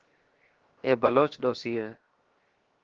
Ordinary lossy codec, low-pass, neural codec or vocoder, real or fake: Opus, 16 kbps; 7.2 kHz; codec, 16 kHz, 0.7 kbps, FocalCodec; fake